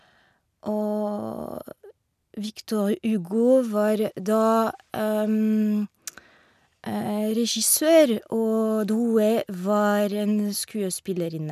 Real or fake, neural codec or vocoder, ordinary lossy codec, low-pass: real; none; none; 14.4 kHz